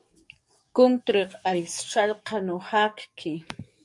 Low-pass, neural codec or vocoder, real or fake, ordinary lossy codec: 10.8 kHz; codec, 44.1 kHz, 7.8 kbps, DAC; fake; MP3, 64 kbps